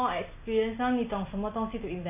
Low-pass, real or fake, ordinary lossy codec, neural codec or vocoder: 3.6 kHz; real; MP3, 24 kbps; none